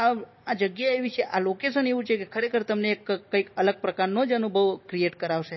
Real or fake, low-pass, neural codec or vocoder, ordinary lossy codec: real; 7.2 kHz; none; MP3, 24 kbps